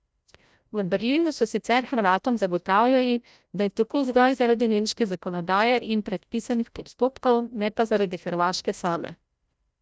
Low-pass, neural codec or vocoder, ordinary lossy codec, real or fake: none; codec, 16 kHz, 0.5 kbps, FreqCodec, larger model; none; fake